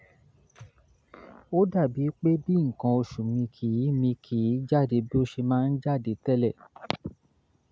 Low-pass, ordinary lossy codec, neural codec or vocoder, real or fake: none; none; none; real